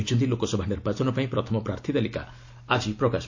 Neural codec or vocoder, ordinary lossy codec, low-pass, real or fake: none; AAC, 48 kbps; 7.2 kHz; real